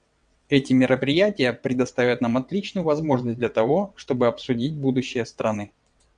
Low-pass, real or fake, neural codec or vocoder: 9.9 kHz; fake; vocoder, 22.05 kHz, 80 mel bands, WaveNeXt